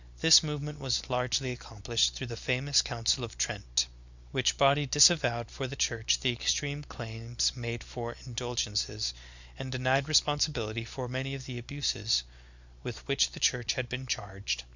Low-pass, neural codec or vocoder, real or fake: 7.2 kHz; none; real